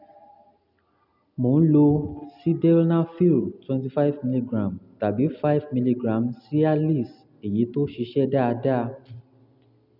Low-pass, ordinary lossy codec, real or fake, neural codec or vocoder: 5.4 kHz; none; real; none